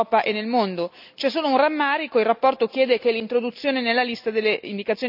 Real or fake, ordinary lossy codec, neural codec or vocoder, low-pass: real; none; none; 5.4 kHz